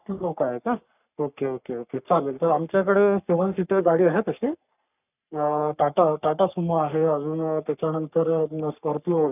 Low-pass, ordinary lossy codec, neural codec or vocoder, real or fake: 3.6 kHz; none; codec, 44.1 kHz, 3.4 kbps, Pupu-Codec; fake